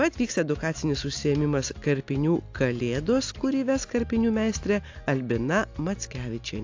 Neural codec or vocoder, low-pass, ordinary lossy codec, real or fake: none; 7.2 kHz; AAC, 48 kbps; real